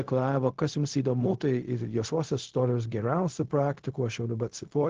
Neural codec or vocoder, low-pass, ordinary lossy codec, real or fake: codec, 16 kHz, 0.4 kbps, LongCat-Audio-Codec; 7.2 kHz; Opus, 16 kbps; fake